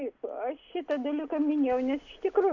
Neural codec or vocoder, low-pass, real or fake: none; 7.2 kHz; real